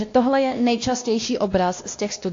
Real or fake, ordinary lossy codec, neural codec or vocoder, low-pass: fake; AAC, 48 kbps; codec, 16 kHz, 1 kbps, X-Codec, WavLM features, trained on Multilingual LibriSpeech; 7.2 kHz